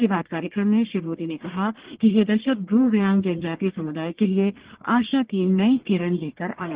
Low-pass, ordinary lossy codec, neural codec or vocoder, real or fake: 3.6 kHz; Opus, 16 kbps; codec, 44.1 kHz, 1.7 kbps, Pupu-Codec; fake